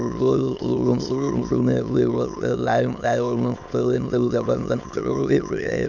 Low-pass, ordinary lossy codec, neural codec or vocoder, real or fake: 7.2 kHz; none; autoencoder, 22.05 kHz, a latent of 192 numbers a frame, VITS, trained on many speakers; fake